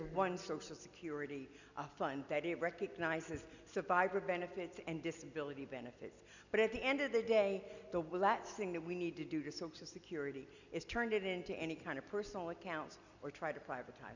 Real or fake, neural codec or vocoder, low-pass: real; none; 7.2 kHz